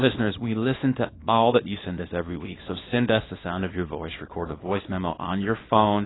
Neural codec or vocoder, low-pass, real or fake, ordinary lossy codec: codec, 24 kHz, 0.9 kbps, WavTokenizer, small release; 7.2 kHz; fake; AAC, 16 kbps